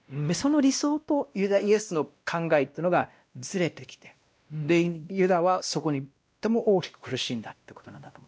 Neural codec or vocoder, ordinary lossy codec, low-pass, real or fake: codec, 16 kHz, 1 kbps, X-Codec, WavLM features, trained on Multilingual LibriSpeech; none; none; fake